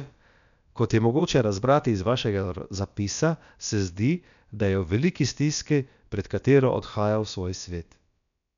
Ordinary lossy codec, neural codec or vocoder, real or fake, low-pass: none; codec, 16 kHz, about 1 kbps, DyCAST, with the encoder's durations; fake; 7.2 kHz